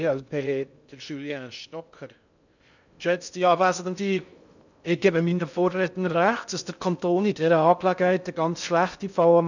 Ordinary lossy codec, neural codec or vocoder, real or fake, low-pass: none; codec, 16 kHz in and 24 kHz out, 0.6 kbps, FocalCodec, streaming, 2048 codes; fake; 7.2 kHz